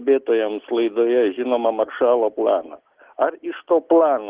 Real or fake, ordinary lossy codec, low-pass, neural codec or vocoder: real; Opus, 16 kbps; 3.6 kHz; none